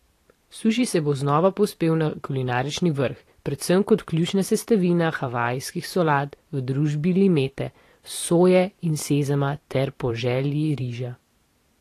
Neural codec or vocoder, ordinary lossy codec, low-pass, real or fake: vocoder, 44.1 kHz, 128 mel bands every 512 samples, BigVGAN v2; AAC, 48 kbps; 14.4 kHz; fake